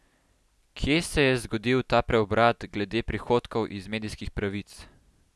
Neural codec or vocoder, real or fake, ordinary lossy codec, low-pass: none; real; none; none